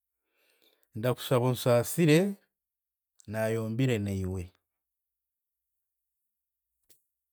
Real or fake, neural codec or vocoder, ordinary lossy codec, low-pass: real; none; none; none